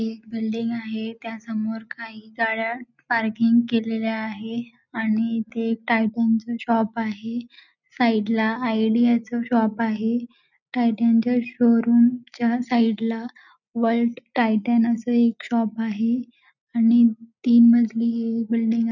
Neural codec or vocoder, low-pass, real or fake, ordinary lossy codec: none; 7.2 kHz; real; none